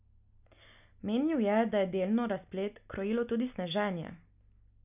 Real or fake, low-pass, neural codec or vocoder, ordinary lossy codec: real; 3.6 kHz; none; none